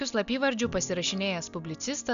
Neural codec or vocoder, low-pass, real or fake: none; 7.2 kHz; real